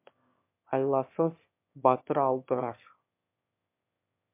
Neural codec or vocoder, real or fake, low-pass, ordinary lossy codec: autoencoder, 22.05 kHz, a latent of 192 numbers a frame, VITS, trained on one speaker; fake; 3.6 kHz; MP3, 32 kbps